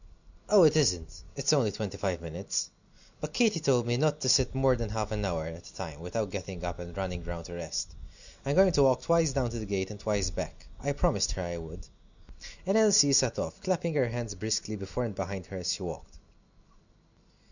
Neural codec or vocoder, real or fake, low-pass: none; real; 7.2 kHz